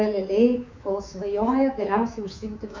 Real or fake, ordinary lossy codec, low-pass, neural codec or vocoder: fake; MP3, 64 kbps; 7.2 kHz; codec, 24 kHz, 3.1 kbps, DualCodec